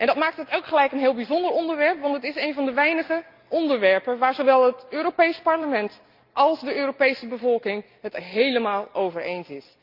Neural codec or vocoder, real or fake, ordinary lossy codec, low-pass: none; real; Opus, 24 kbps; 5.4 kHz